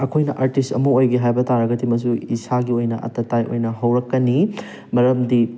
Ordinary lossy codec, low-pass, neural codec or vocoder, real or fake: none; none; none; real